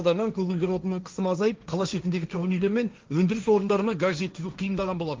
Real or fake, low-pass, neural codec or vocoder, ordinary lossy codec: fake; 7.2 kHz; codec, 16 kHz, 1.1 kbps, Voila-Tokenizer; Opus, 24 kbps